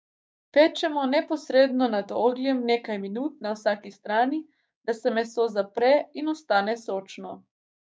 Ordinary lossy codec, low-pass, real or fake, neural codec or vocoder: none; none; fake; codec, 16 kHz, 6 kbps, DAC